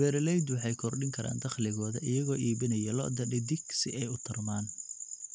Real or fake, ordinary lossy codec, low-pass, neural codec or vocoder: real; none; none; none